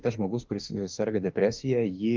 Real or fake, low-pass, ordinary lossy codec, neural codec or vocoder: fake; 7.2 kHz; Opus, 24 kbps; autoencoder, 48 kHz, 32 numbers a frame, DAC-VAE, trained on Japanese speech